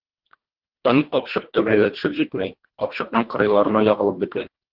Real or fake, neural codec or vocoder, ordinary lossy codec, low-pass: fake; codec, 24 kHz, 1.5 kbps, HILCodec; Opus, 16 kbps; 5.4 kHz